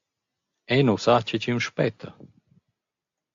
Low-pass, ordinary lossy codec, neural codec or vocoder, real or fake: 7.2 kHz; AAC, 64 kbps; none; real